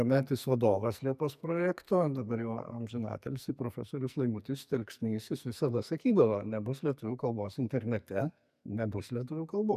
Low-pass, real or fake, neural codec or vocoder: 14.4 kHz; fake; codec, 44.1 kHz, 2.6 kbps, SNAC